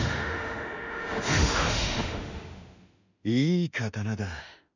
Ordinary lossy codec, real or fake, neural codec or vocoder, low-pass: none; fake; autoencoder, 48 kHz, 32 numbers a frame, DAC-VAE, trained on Japanese speech; 7.2 kHz